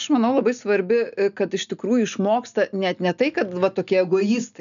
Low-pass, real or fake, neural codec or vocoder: 7.2 kHz; real; none